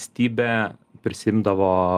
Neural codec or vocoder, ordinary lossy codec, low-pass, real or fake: none; Opus, 32 kbps; 14.4 kHz; real